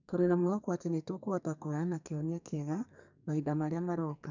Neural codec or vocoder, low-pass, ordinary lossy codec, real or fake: codec, 44.1 kHz, 2.6 kbps, SNAC; 7.2 kHz; none; fake